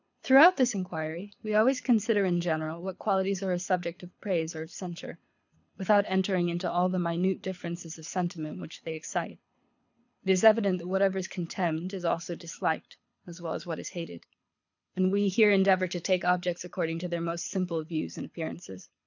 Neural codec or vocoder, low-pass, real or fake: codec, 24 kHz, 6 kbps, HILCodec; 7.2 kHz; fake